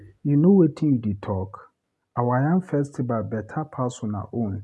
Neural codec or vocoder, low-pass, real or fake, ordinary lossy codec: none; none; real; none